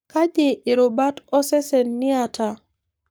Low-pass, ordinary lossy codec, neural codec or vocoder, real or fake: none; none; codec, 44.1 kHz, 7.8 kbps, Pupu-Codec; fake